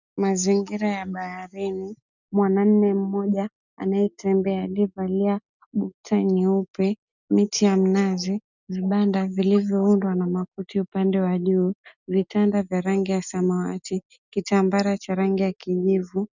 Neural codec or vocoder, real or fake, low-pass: none; real; 7.2 kHz